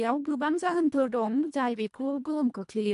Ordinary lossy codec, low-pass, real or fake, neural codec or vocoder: MP3, 64 kbps; 10.8 kHz; fake; codec, 24 kHz, 1.5 kbps, HILCodec